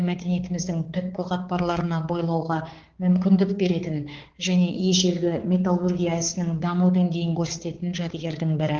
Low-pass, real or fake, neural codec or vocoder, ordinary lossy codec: 7.2 kHz; fake; codec, 16 kHz, 4 kbps, X-Codec, HuBERT features, trained on balanced general audio; Opus, 16 kbps